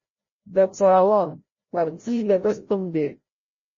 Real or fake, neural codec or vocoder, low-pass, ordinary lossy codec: fake; codec, 16 kHz, 0.5 kbps, FreqCodec, larger model; 7.2 kHz; MP3, 32 kbps